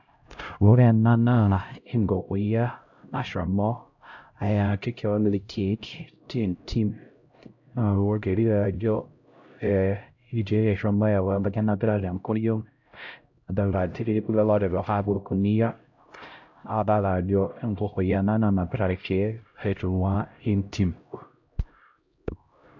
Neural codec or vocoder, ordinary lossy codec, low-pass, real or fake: codec, 16 kHz, 0.5 kbps, X-Codec, HuBERT features, trained on LibriSpeech; AAC, 48 kbps; 7.2 kHz; fake